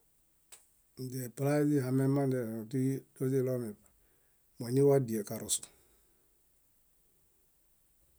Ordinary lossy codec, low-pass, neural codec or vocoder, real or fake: none; none; none; real